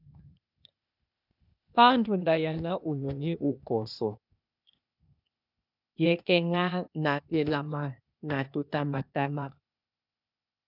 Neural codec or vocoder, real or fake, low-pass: codec, 16 kHz, 0.8 kbps, ZipCodec; fake; 5.4 kHz